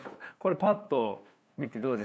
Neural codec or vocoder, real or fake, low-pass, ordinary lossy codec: codec, 16 kHz, 2 kbps, FunCodec, trained on LibriTTS, 25 frames a second; fake; none; none